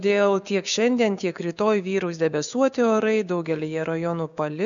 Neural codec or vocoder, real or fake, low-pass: codec, 16 kHz, 6 kbps, DAC; fake; 7.2 kHz